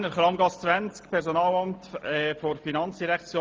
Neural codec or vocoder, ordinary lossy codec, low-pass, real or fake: none; Opus, 24 kbps; 7.2 kHz; real